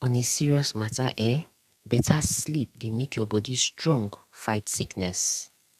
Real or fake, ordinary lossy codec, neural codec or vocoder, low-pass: fake; none; codec, 32 kHz, 1.9 kbps, SNAC; 14.4 kHz